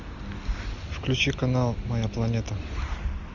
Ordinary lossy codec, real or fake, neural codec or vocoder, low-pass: Opus, 64 kbps; real; none; 7.2 kHz